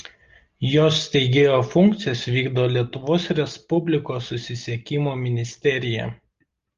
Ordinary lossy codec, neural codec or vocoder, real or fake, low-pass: Opus, 32 kbps; none; real; 7.2 kHz